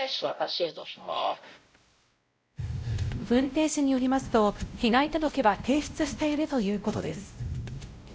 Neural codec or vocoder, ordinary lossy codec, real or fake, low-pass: codec, 16 kHz, 0.5 kbps, X-Codec, WavLM features, trained on Multilingual LibriSpeech; none; fake; none